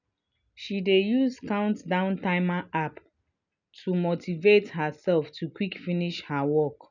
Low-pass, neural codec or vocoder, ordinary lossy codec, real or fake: 7.2 kHz; none; none; real